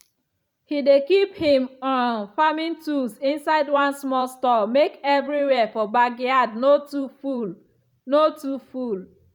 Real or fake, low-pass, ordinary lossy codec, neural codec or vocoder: fake; 19.8 kHz; none; vocoder, 44.1 kHz, 128 mel bands every 512 samples, BigVGAN v2